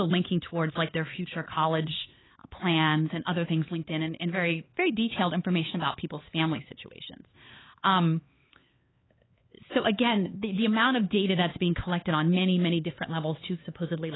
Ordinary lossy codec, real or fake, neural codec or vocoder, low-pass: AAC, 16 kbps; fake; codec, 16 kHz, 4 kbps, X-Codec, HuBERT features, trained on LibriSpeech; 7.2 kHz